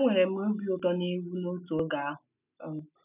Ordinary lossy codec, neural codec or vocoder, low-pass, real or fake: none; none; 3.6 kHz; real